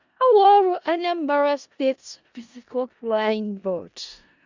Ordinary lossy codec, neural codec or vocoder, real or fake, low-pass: Opus, 64 kbps; codec, 16 kHz in and 24 kHz out, 0.4 kbps, LongCat-Audio-Codec, four codebook decoder; fake; 7.2 kHz